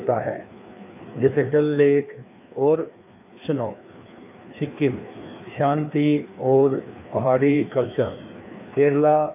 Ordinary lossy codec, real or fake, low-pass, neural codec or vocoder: MP3, 32 kbps; fake; 3.6 kHz; codec, 16 kHz, 2 kbps, FreqCodec, larger model